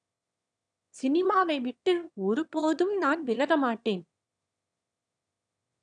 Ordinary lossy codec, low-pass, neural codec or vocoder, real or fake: none; 9.9 kHz; autoencoder, 22.05 kHz, a latent of 192 numbers a frame, VITS, trained on one speaker; fake